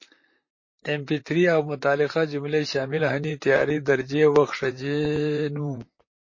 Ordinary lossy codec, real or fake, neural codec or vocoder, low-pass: MP3, 32 kbps; fake; vocoder, 44.1 kHz, 128 mel bands, Pupu-Vocoder; 7.2 kHz